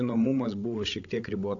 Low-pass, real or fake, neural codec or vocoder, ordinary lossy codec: 7.2 kHz; fake; codec, 16 kHz, 16 kbps, FreqCodec, larger model; AAC, 48 kbps